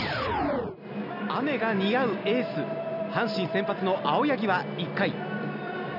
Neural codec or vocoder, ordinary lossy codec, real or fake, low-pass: none; none; real; 5.4 kHz